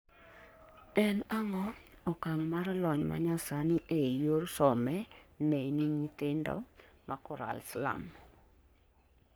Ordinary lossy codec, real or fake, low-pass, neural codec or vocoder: none; fake; none; codec, 44.1 kHz, 3.4 kbps, Pupu-Codec